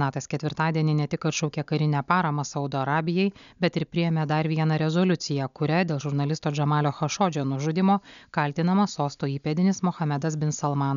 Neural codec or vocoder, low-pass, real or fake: none; 7.2 kHz; real